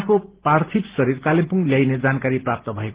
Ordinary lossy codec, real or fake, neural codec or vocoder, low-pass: Opus, 16 kbps; real; none; 3.6 kHz